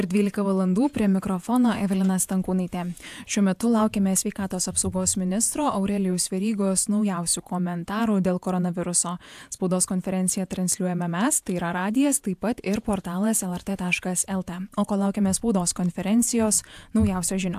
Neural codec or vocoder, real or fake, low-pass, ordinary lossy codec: vocoder, 48 kHz, 128 mel bands, Vocos; fake; 14.4 kHz; AAC, 96 kbps